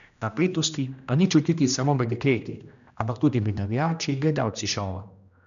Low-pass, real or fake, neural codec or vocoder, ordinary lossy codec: 7.2 kHz; fake; codec, 16 kHz, 1 kbps, X-Codec, HuBERT features, trained on general audio; none